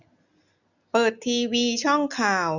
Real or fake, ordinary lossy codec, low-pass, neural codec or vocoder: real; none; 7.2 kHz; none